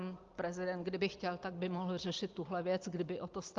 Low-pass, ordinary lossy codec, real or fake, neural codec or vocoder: 7.2 kHz; Opus, 32 kbps; real; none